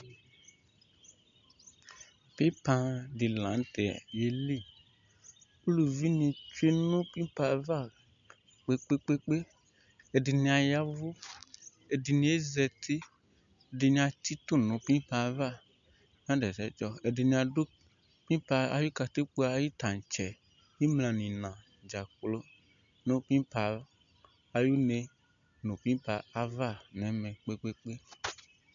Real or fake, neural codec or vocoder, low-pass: real; none; 7.2 kHz